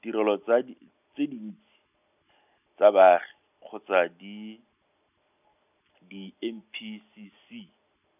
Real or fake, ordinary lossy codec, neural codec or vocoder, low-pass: real; none; none; 3.6 kHz